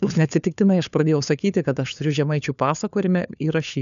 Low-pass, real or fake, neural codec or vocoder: 7.2 kHz; fake; codec, 16 kHz, 4 kbps, FunCodec, trained on LibriTTS, 50 frames a second